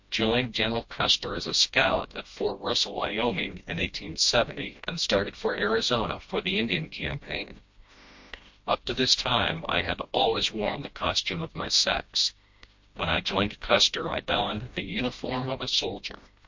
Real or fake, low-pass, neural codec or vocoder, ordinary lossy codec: fake; 7.2 kHz; codec, 16 kHz, 1 kbps, FreqCodec, smaller model; MP3, 48 kbps